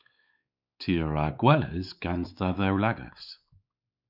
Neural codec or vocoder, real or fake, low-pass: codec, 16 kHz, 4 kbps, X-Codec, WavLM features, trained on Multilingual LibriSpeech; fake; 5.4 kHz